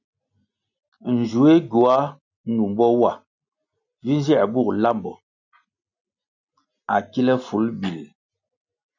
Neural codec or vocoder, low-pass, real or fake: none; 7.2 kHz; real